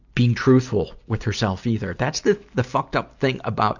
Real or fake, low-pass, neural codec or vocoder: real; 7.2 kHz; none